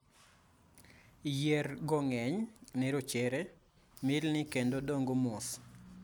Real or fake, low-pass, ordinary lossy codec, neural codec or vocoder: real; none; none; none